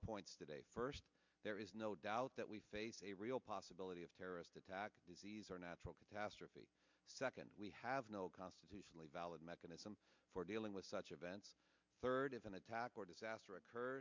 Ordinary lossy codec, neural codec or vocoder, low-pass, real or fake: MP3, 64 kbps; none; 7.2 kHz; real